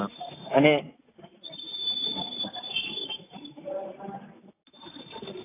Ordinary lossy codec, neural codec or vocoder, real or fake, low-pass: AAC, 16 kbps; none; real; 3.6 kHz